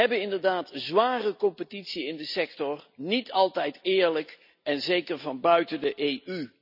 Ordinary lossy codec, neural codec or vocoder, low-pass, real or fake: none; none; 5.4 kHz; real